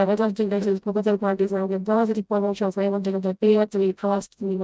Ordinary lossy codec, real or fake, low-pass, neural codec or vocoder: none; fake; none; codec, 16 kHz, 0.5 kbps, FreqCodec, smaller model